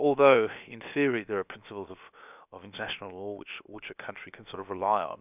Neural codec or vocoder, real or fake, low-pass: codec, 16 kHz, about 1 kbps, DyCAST, with the encoder's durations; fake; 3.6 kHz